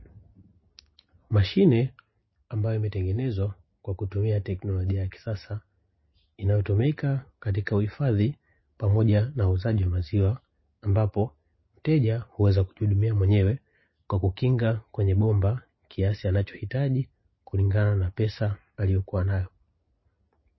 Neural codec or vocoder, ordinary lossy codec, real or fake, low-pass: none; MP3, 24 kbps; real; 7.2 kHz